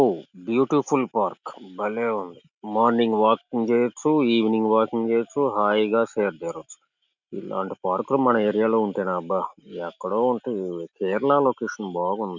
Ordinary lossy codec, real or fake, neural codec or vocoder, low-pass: none; real; none; 7.2 kHz